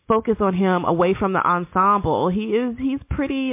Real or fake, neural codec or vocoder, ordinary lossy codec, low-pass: real; none; MP3, 24 kbps; 3.6 kHz